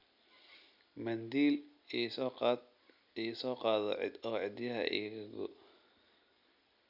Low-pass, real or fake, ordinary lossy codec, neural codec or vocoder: 5.4 kHz; real; none; none